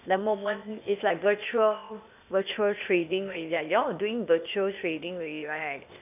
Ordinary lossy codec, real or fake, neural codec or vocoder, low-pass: none; fake; codec, 16 kHz, 0.8 kbps, ZipCodec; 3.6 kHz